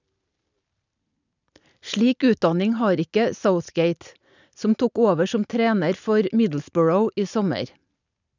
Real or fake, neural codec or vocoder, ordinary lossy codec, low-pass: real; none; none; 7.2 kHz